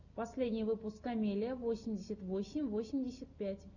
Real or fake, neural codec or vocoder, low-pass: real; none; 7.2 kHz